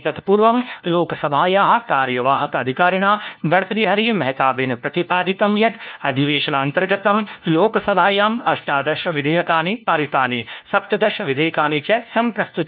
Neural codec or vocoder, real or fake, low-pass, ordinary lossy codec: codec, 16 kHz, 1 kbps, FunCodec, trained on LibriTTS, 50 frames a second; fake; 5.4 kHz; none